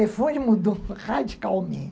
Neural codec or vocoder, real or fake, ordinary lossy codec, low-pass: none; real; none; none